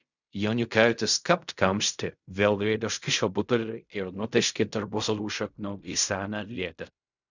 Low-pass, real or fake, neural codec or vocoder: 7.2 kHz; fake; codec, 16 kHz in and 24 kHz out, 0.4 kbps, LongCat-Audio-Codec, fine tuned four codebook decoder